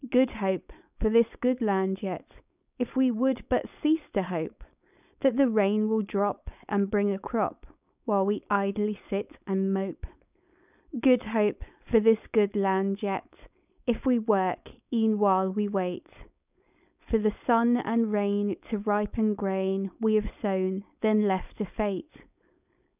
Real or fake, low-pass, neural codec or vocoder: fake; 3.6 kHz; codec, 16 kHz, 4.8 kbps, FACodec